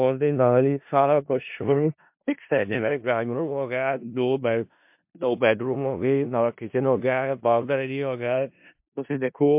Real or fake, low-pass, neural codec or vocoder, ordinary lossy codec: fake; 3.6 kHz; codec, 16 kHz in and 24 kHz out, 0.4 kbps, LongCat-Audio-Codec, four codebook decoder; MP3, 32 kbps